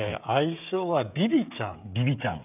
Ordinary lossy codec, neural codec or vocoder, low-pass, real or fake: none; codec, 16 kHz, 16 kbps, FreqCodec, smaller model; 3.6 kHz; fake